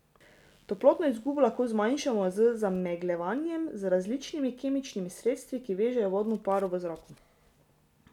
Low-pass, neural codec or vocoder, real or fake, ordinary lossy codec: 19.8 kHz; none; real; none